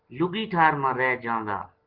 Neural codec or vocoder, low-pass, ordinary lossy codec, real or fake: none; 5.4 kHz; Opus, 16 kbps; real